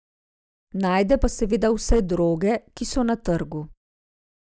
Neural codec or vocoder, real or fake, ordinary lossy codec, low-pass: none; real; none; none